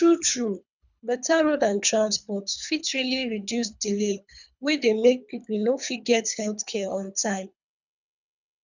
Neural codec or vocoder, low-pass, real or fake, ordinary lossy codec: codec, 24 kHz, 3 kbps, HILCodec; 7.2 kHz; fake; none